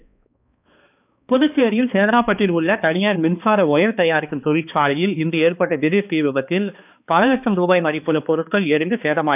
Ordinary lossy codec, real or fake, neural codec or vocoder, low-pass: none; fake; codec, 16 kHz, 2 kbps, X-Codec, HuBERT features, trained on balanced general audio; 3.6 kHz